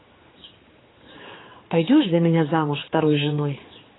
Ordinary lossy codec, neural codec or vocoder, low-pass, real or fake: AAC, 16 kbps; codec, 16 kHz, 4 kbps, X-Codec, HuBERT features, trained on general audio; 7.2 kHz; fake